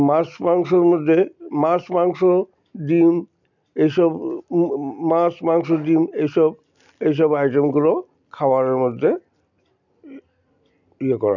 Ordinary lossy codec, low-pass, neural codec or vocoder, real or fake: none; 7.2 kHz; none; real